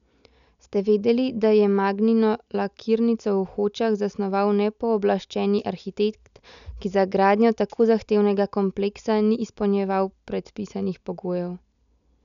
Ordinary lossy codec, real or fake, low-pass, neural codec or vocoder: none; real; 7.2 kHz; none